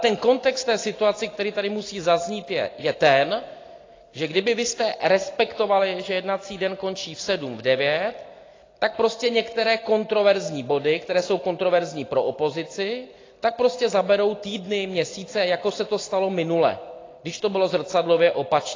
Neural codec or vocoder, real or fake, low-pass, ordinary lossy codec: none; real; 7.2 kHz; AAC, 32 kbps